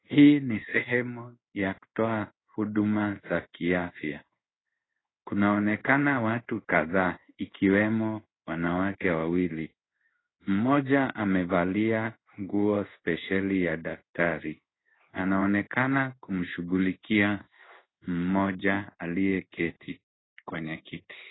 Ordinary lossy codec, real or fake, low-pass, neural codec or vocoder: AAC, 16 kbps; fake; 7.2 kHz; codec, 16 kHz in and 24 kHz out, 1 kbps, XY-Tokenizer